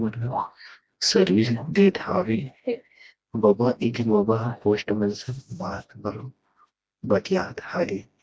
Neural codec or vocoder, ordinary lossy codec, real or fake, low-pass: codec, 16 kHz, 1 kbps, FreqCodec, smaller model; none; fake; none